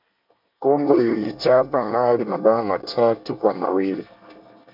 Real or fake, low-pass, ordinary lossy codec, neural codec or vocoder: fake; 5.4 kHz; MP3, 48 kbps; codec, 24 kHz, 1 kbps, SNAC